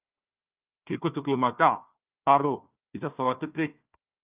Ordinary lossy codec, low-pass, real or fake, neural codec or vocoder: Opus, 24 kbps; 3.6 kHz; fake; codec, 16 kHz, 1 kbps, FunCodec, trained on Chinese and English, 50 frames a second